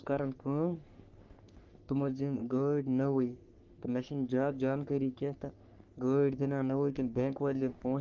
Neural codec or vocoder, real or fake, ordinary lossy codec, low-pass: codec, 44.1 kHz, 3.4 kbps, Pupu-Codec; fake; Opus, 32 kbps; 7.2 kHz